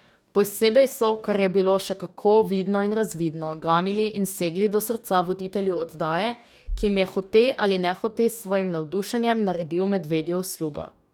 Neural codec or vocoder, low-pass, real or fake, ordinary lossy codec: codec, 44.1 kHz, 2.6 kbps, DAC; 19.8 kHz; fake; none